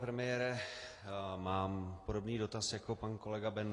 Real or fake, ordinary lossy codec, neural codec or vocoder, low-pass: real; AAC, 32 kbps; none; 10.8 kHz